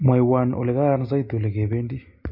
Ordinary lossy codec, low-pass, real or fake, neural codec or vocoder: MP3, 32 kbps; 5.4 kHz; real; none